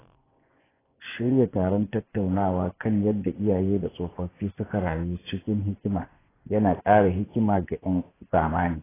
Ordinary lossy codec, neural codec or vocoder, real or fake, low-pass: AAC, 16 kbps; vocoder, 24 kHz, 100 mel bands, Vocos; fake; 3.6 kHz